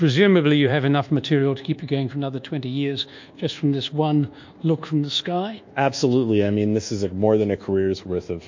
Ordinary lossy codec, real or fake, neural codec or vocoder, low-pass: MP3, 48 kbps; fake; codec, 24 kHz, 1.2 kbps, DualCodec; 7.2 kHz